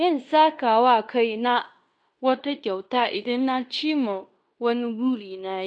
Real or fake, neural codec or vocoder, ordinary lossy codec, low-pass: fake; codec, 16 kHz in and 24 kHz out, 0.9 kbps, LongCat-Audio-Codec, fine tuned four codebook decoder; none; 9.9 kHz